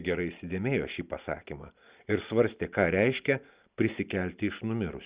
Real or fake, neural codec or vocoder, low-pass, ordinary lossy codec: real; none; 3.6 kHz; Opus, 64 kbps